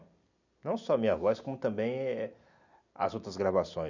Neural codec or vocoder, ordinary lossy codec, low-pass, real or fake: vocoder, 44.1 kHz, 128 mel bands every 256 samples, BigVGAN v2; none; 7.2 kHz; fake